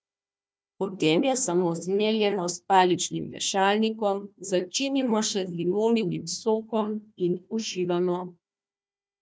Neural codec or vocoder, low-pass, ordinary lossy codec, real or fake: codec, 16 kHz, 1 kbps, FunCodec, trained on Chinese and English, 50 frames a second; none; none; fake